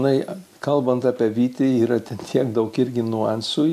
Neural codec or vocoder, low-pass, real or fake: none; 14.4 kHz; real